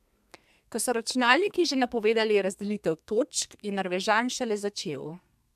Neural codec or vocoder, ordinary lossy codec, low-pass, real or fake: codec, 32 kHz, 1.9 kbps, SNAC; AAC, 96 kbps; 14.4 kHz; fake